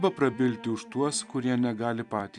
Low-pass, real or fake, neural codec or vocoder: 10.8 kHz; fake; vocoder, 44.1 kHz, 128 mel bands every 256 samples, BigVGAN v2